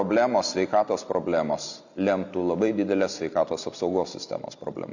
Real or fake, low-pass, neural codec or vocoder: real; 7.2 kHz; none